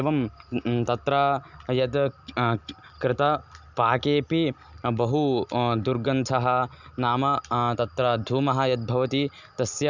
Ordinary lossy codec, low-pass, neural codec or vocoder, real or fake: none; 7.2 kHz; none; real